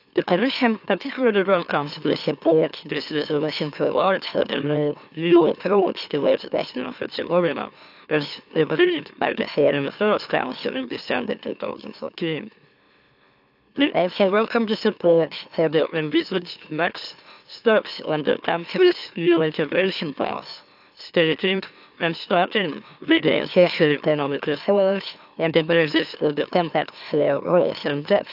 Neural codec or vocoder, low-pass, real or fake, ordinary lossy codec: autoencoder, 44.1 kHz, a latent of 192 numbers a frame, MeloTTS; 5.4 kHz; fake; AAC, 48 kbps